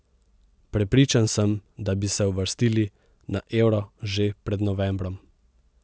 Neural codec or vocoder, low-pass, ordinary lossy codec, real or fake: none; none; none; real